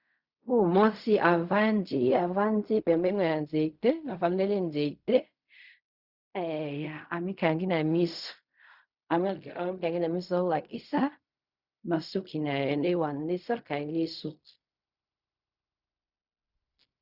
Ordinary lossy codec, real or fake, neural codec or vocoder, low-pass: Opus, 64 kbps; fake; codec, 16 kHz in and 24 kHz out, 0.4 kbps, LongCat-Audio-Codec, fine tuned four codebook decoder; 5.4 kHz